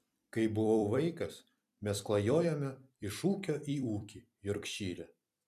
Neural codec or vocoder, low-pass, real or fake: vocoder, 44.1 kHz, 128 mel bands every 256 samples, BigVGAN v2; 14.4 kHz; fake